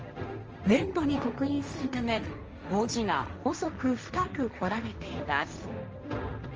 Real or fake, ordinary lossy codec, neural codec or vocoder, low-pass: fake; Opus, 24 kbps; codec, 16 kHz, 1.1 kbps, Voila-Tokenizer; 7.2 kHz